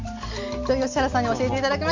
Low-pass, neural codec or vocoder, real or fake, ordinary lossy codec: 7.2 kHz; none; real; Opus, 64 kbps